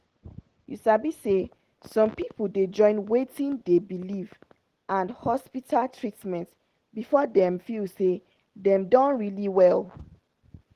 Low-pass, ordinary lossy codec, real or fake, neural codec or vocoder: 14.4 kHz; Opus, 16 kbps; real; none